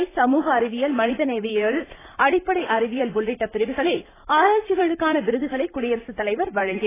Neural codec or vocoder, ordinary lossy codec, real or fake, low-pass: vocoder, 44.1 kHz, 128 mel bands, Pupu-Vocoder; AAC, 16 kbps; fake; 3.6 kHz